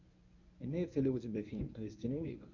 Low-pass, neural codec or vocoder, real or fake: 7.2 kHz; codec, 24 kHz, 0.9 kbps, WavTokenizer, medium speech release version 1; fake